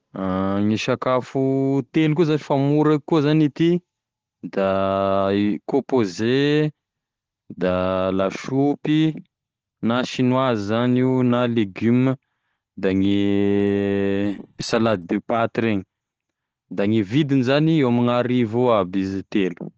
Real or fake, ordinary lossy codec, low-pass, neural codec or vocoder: real; Opus, 16 kbps; 7.2 kHz; none